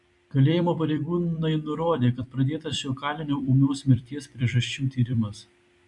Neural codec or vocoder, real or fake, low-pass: vocoder, 44.1 kHz, 128 mel bands every 256 samples, BigVGAN v2; fake; 10.8 kHz